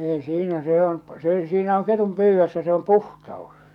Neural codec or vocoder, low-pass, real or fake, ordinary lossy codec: codec, 44.1 kHz, 7.8 kbps, DAC; 19.8 kHz; fake; none